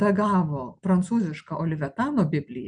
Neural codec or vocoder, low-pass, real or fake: none; 9.9 kHz; real